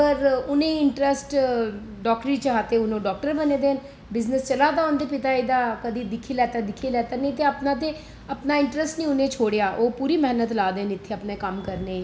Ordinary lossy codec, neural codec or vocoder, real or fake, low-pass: none; none; real; none